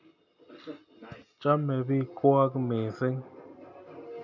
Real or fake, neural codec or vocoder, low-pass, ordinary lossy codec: real; none; 7.2 kHz; none